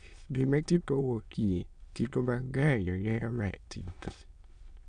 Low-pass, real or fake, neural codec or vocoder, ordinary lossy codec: 9.9 kHz; fake; autoencoder, 22.05 kHz, a latent of 192 numbers a frame, VITS, trained on many speakers; none